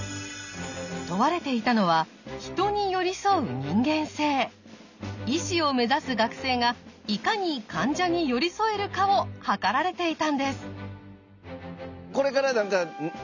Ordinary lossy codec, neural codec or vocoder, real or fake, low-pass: none; none; real; 7.2 kHz